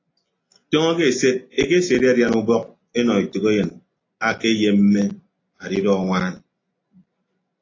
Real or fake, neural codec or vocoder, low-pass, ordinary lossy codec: real; none; 7.2 kHz; AAC, 32 kbps